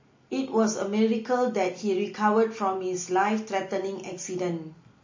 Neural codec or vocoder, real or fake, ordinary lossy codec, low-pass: none; real; MP3, 32 kbps; 7.2 kHz